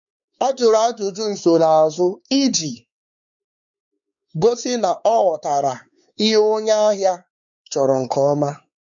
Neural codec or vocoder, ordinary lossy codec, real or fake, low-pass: codec, 16 kHz, 4 kbps, X-Codec, WavLM features, trained on Multilingual LibriSpeech; none; fake; 7.2 kHz